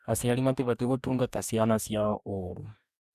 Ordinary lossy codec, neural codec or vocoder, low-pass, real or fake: AAC, 96 kbps; codec, 44.1 kHz, 2.6 kbps, DAC; 14.4 kHz; fake